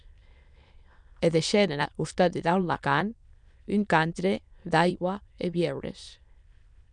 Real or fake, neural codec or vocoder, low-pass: fake; autoencoder, 22.05 kHz, a latent of 192 numbers a frame, VITS, trained on many speakers; 9.9 kHz